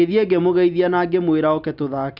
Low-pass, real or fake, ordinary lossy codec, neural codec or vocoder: 5.4 kHz; real; none; none